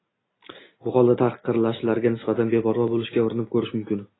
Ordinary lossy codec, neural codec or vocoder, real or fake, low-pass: AAC, 16 kbps; none; real; 7.2 kHz